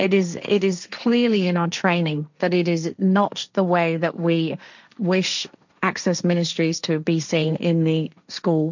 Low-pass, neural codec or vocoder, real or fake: 7.2 kHz; codec, 16 kHz, 1.1 kbps, Voila-Tokenizer; fake